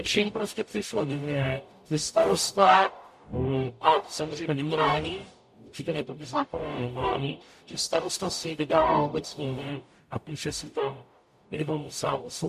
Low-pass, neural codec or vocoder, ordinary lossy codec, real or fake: 14.4 kHz; codec, 44.1 kHz, 0.9 kbps, DAC; MP3, 64 kbps; fake